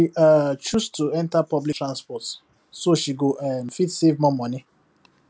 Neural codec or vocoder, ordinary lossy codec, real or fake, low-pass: none; none; real; none